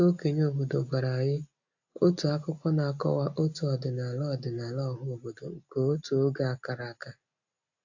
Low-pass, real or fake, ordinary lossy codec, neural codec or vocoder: 7.2 kHz; real; AAC, 48 kbps; none